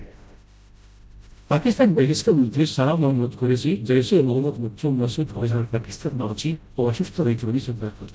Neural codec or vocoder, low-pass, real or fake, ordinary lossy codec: codec, 16 kHz, 0.5 kbps, FreqCodec, smaller model; none; fake; none